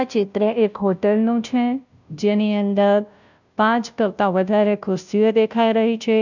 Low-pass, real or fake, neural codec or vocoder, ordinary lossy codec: 7.2 kHz; fake; codec, 16 kHz, 0.5 kbps, FunCodec, trained on Chinese and English, 25 frames a second; none